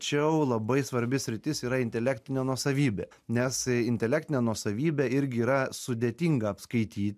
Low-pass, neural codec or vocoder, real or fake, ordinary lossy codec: 14.4 kHz; none; real; MP3, 96 kbps